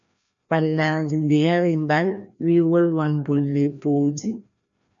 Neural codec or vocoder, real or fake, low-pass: codec, 16 kHz, 1 kbps, FreqCodec, larger model; fake; 7.2 kHz